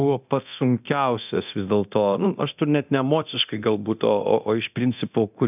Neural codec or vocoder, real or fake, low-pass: codec, 24 kHz, 0.9 kbps, DualCodec; fake; 3.6 kHz